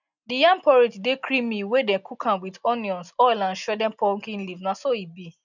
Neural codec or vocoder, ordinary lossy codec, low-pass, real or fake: none; none; 7.2 kHz; real